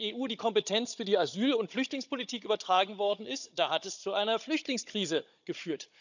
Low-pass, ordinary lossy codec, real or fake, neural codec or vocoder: 7.2 kHz; none; fake; codec, 16 kHz, 16 kbps, FunCodec, trained on Chinese and English, 50 frames a second